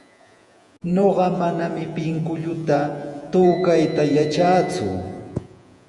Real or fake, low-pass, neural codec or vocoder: fake; 10.8 kHz; vocoder, 48 kHz, 128 mel bands, Vocos